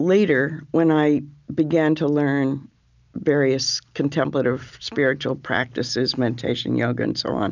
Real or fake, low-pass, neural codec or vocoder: real; 7.2 kHz; none